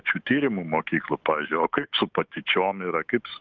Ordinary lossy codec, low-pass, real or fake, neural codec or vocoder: Opus, 24 kbps; 7.2 kHz; fake; autoencoder, 48 kHz, 128 numbers a frame, DAC-VAE, trained on Japanese speech